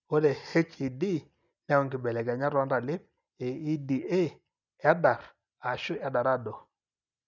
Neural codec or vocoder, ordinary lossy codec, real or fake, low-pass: none; none; real; 7.2 kHz